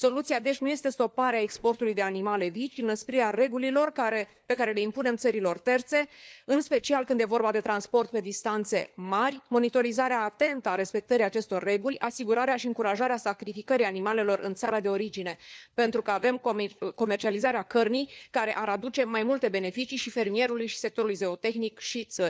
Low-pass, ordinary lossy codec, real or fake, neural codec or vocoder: none; none; fake; codec, 16 kHz, 4 kbps, FunCodec, trained on LibriTTS, 50 frames a second